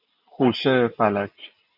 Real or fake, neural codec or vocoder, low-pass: real; none; 5.4 kHz